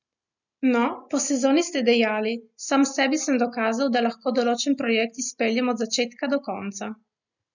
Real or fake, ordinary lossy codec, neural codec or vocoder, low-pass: real; none; none; 7.2 kHz